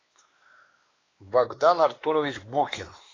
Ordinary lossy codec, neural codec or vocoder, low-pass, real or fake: AAC, 32 kbps; codec, 16 kHz, 2 kbps, X-Codec, WavLM features, trained on Multilingual LibriSpeech; 7.2 kHz; fake